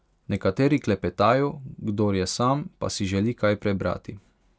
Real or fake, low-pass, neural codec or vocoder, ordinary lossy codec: real; none; none; none